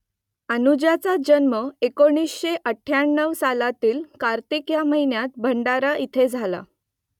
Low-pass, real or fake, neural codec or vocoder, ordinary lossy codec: 19.8 kHz; real; none; none